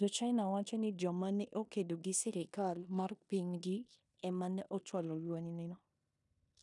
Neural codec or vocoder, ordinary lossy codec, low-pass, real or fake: codec, 16 kHz in and 24 kHz out, 0.9 kbps, LongCat-Audio-Codec, four codebook decoder; none; 10.8 kHz; fake